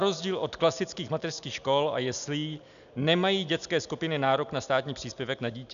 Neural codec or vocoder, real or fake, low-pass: none; real; 7.2 kHz